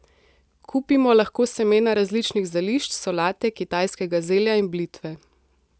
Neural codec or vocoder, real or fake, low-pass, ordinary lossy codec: none; real; none; none